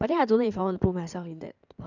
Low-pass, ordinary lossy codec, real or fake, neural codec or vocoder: 7.2 kHz; none; fake; codec, 16 kHz in and 24 kHz out, 2.2 kbps, FireRedTTS-2 codec